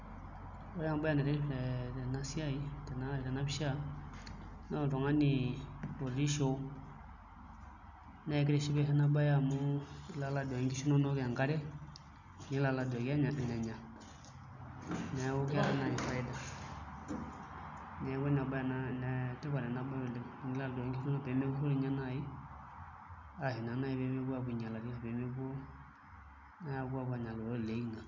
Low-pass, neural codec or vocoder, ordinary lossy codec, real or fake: 7.2 kHz; none; none; real